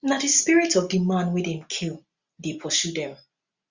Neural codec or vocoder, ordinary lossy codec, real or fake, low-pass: none; Opus, 64 kbps; real; 7.2 kHz